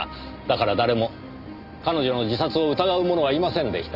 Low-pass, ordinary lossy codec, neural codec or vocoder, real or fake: 5.4 kHz; none; none; real